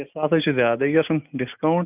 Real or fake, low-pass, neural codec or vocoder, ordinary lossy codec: real; 3.6 kHz; none; none